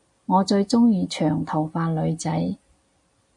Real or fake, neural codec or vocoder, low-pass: real; none; 10.8 kHz